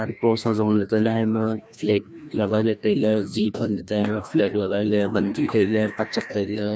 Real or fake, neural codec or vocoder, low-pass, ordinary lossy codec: fake; codec, 16 kHz, 1 kbps, FreqCodec, larger model; none; none